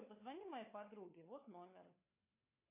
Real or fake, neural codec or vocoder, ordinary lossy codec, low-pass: fake; codec, 16 kHz, 4 kbps, FunCodec, trained on LibriTTS, 50 frames a second; MP3, 24 kbps; 3.6 kHz